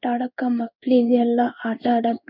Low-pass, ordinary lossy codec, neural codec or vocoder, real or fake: 5.4 kHz; none; codec, 16 kHz in and 24 kHz out, 1 kbps, XY-Tokenizer; fake